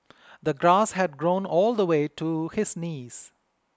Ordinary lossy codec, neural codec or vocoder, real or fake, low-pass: none; none; real; none